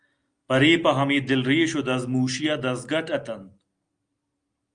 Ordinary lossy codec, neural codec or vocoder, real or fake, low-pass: Opus, 32 kbps; none; real; 9.9 kHz